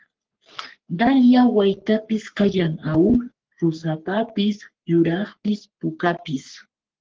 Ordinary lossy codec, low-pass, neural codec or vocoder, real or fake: Opus, 16 kbps; 7.2 kHz; codec, 44.1 kHz, 3.4 kbps, Pupu-Codec; fake